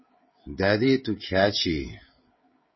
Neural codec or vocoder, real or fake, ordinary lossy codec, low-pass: codec, 16 kHz, 16 kbps, FreqCodec, smaller model; fake; MP3, 24 kbps; 7.2 kHz